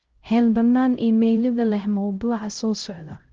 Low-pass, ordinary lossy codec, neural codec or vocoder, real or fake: 7.2 kHz; Opus, 16 kbps; codec, 16 kHz, 0.5 kbps, X-Codec, HuBERT features, trained on LibriSpeech; fake